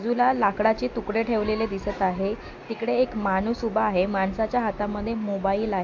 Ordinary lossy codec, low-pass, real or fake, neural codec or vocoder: AAC, 48 kbps; 7.2 kHz; real; none